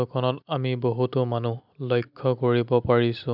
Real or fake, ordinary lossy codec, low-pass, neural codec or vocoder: real; none; 5.4 kHz; none